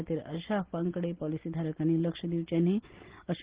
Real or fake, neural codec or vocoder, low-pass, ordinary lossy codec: real; none; 3.6 kHz; Opus, 16 kbps